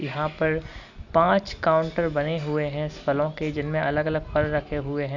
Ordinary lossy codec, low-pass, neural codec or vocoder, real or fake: none; 7.2 kHz; none; real